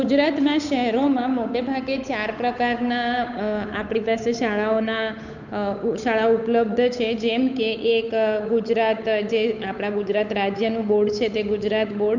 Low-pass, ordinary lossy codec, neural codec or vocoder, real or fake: 7.2 kHz; none; codec, 16 kHz, 8 kbps, FunCodec, trained on Chinese and English, 25 frames a second; fake